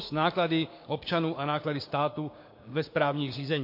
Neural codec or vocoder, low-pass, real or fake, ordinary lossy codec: codec, 16 kHz, 6 kbps, DAC; 5.4 kHz; fake; MP3, 32 kbps